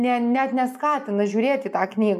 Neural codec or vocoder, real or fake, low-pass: none; real; 14.4 kHz